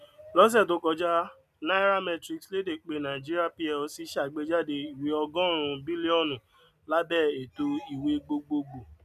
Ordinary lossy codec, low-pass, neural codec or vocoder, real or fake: none; 14.4 kHz; none; real